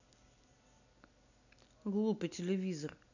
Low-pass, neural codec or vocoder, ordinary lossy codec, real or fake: 7.2 kHz; none; none; real